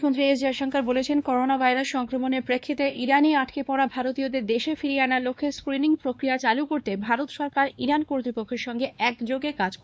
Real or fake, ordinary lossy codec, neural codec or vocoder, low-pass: fake; none; codec, 16 kHz, 2 kbps, X-Codec, WavLM features, trained on Multilingual LibriSpeech; none